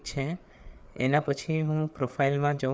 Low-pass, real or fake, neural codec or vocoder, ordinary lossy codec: none; fake; codec, 16 kHz, 4 kbps, FreqCodec, larger model; none